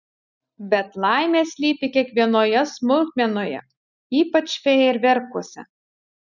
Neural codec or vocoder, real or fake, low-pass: none; real; 7.2 kHz